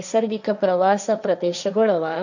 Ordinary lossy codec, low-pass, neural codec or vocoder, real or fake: none; 7.2 kHz; codec, 16 kHz, 1.1 kbps, Voila-Tokenizer; fake